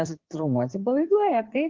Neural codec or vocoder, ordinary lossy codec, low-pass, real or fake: codec, 16 kHz in and 24 kHz out, 2.2 kbps, FireRedTTS-2 codec; Opus, 16 kbps; 7.2 kHz; fake